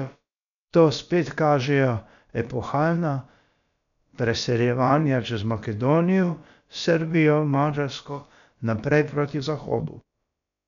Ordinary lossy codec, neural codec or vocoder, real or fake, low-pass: none; codec, 16 kHz, about 1 kbps, DyCAST, with the encoder's durations; fake; 7.2 kHz